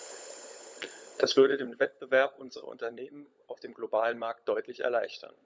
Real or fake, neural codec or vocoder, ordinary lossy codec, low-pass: fake; codec, 16 kHz, 16 kbps, FunCodec, trained on LibriTTS, 50 frames a second; none; none